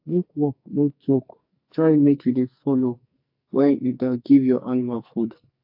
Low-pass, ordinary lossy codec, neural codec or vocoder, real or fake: 5.4 kHz; AAC, 48 kbps; codec, 44.1 kHz, 2.6 kbps, SNAC; fake